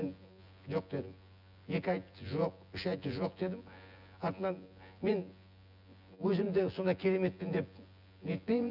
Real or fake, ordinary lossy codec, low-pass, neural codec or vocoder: fake; none; 5.4 kHz; vocoder, 24 kHz, 100 mel bands, Vocos